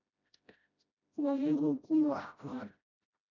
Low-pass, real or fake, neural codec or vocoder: 7.2 kHz; fake; codec, 16 kHz, 0.5 kbps, FreqCodec, smaller model